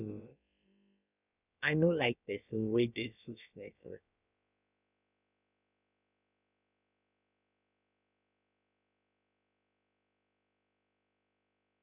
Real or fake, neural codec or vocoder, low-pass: fake; codec, 16 kHz, about 1 kbps, DyCAST, with the encoder's durations; 3.6 kHz